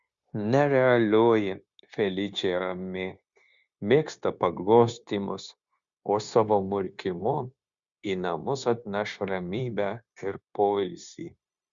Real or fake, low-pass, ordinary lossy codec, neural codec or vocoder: fake; 7.2 kHz; Opus, 64 kbps; codec, 16 kHz, 0.9 kbps, LongCat-Audio-Codec